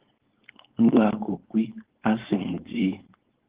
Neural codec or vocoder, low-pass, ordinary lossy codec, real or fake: codec, 16 kHz, 4.8 kbps, FACodec; 3.6 kHz; Opus, 16 kbps; fake